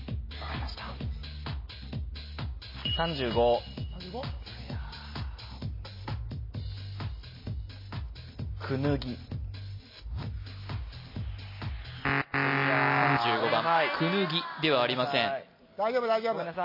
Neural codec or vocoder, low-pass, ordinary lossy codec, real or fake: none; 5.4 kHz; MP3, 24 kbps; real